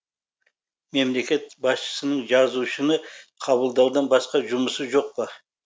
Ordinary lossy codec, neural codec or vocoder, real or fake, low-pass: none; none; real; none